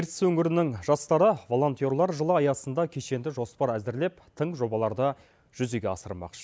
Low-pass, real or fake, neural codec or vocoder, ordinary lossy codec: none; real; none; none